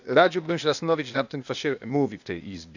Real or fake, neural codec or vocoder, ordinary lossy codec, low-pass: fake; codec, 16 kHz, 0.8 kbps, ZipCodec; none; 7.2 kHz